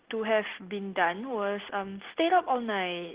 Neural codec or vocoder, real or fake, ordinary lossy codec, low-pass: none; real; Opus, 16 kbps; 3.6 kHz